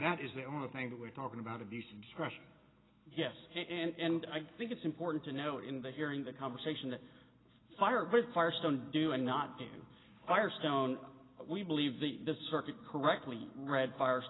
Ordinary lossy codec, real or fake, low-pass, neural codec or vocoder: AAC, 16 kbps; real; 7.2 kHz; none